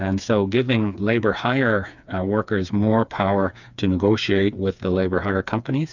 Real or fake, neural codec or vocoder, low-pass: fake; codec, 16 kHz, 4 kbps, FreqCodec, smaller model; 7.2 kHz